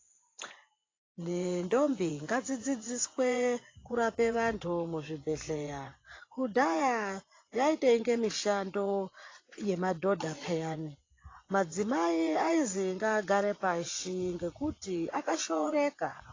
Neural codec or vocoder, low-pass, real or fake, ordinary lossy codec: vocoder, 24 kHz, 100 mel bands, Vocos; 7.2 kHz; fake; AAC, 32 kbps